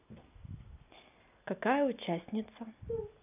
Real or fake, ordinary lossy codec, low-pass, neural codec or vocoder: real; none; 3.6 kHz; none